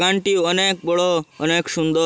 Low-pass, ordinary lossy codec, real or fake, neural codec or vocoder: none; none; real; none